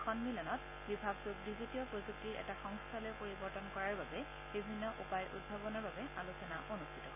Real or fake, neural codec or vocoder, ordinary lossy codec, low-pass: real; none; AAC, 32 kbps; 3.6 kHz